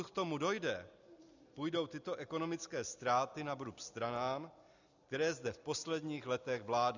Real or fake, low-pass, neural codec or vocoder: real; 7.2 kHz; none